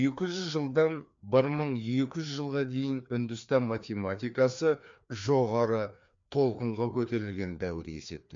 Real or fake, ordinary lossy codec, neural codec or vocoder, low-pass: fake; MP3, 48 kbps; codec, 16 kHz, 2 kbps, FreqCodec, larger model; 7.2 kHz